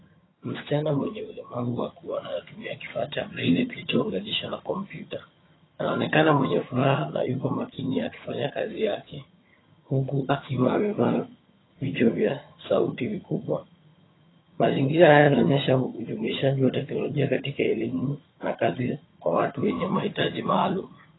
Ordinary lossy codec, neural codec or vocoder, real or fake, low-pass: AAC, 16 kbps; vocoder, 22.05 kHz, 80 mel bands, HiFi-GAN; fake; 7.2 kHz